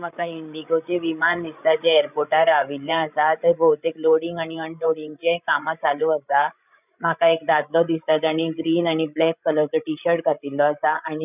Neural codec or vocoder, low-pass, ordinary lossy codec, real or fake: codec, 16 kHz, 16 kbps, FreqCodec, larger model; 3.6 kHz; none; fake